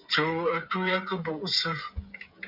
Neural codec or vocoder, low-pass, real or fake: codec, 16 kHz, 16 kbps, FreqCodec, smaller model; 5.4 kHz; fake